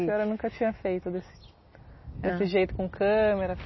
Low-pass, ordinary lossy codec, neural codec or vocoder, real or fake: 7.2 kHz; MP3, 24 kbps; none; real